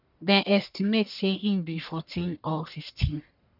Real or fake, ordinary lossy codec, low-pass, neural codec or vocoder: fake; none; 5.4 kHz; codec, 44.1 kHz, 1.7 kbps, Pupu-Codec